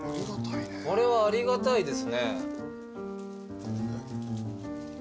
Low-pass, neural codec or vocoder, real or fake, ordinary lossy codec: none; none; real; none